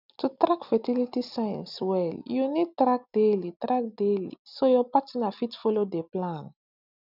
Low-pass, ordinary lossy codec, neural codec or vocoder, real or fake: 5.4 kHz; none; none; real